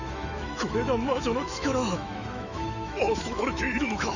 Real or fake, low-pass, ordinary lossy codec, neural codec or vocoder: fake; 7.2 kHz; none; autoencoder, 48 kHz, 128 numbers a frame, DAC-VAE, trained on Japanese speech